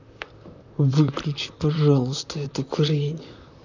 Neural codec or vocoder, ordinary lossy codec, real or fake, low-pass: vocoder, 44.1 kHz, 128 mel bands, Pupu-Vocoder; none; fake; 7.2 kHz